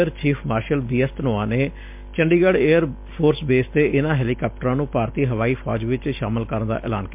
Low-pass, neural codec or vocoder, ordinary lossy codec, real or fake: 3.6 kHz; none; MP3, 32 kbps; real